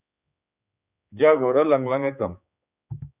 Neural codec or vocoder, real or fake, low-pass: codec, 16 kHz, 4 kbps, X-Codec, HuBERT features, trained on general audio; fake; 3.6 kHz